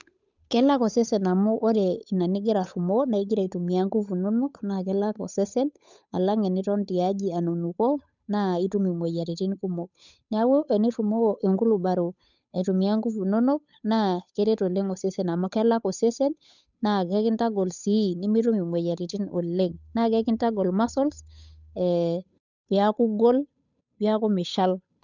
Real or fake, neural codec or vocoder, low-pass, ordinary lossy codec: fake; codec, 16 kHz, 8 kbps, FunCodec, trained on Chinese and English, 25 frames a second; 7.2 kHz; none